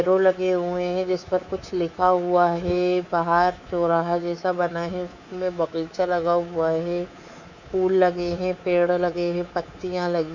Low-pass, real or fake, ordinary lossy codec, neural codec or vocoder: 7.2 kHz; fake; none; codec, 24 kHz, 3.1 kbps, DualCodec